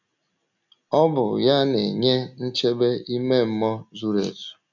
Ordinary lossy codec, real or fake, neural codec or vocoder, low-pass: none; real; none; 7.2 kHz